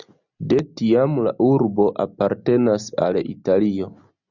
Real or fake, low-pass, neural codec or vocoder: real; 7.2 kHz; none